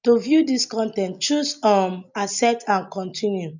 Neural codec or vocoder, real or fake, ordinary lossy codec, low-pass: none; real; none; 7.2 kHz